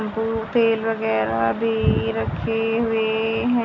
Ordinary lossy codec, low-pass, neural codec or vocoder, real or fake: none; 7.2 kHz; none; real